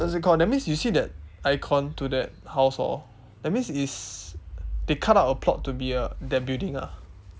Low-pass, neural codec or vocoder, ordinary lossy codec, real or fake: none; none; none; real